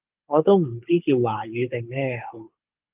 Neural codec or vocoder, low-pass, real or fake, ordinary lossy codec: codec, 24 kHz, 6 kbps, HILCodec; 3.6 kHz; fake; Opus, 24 kbps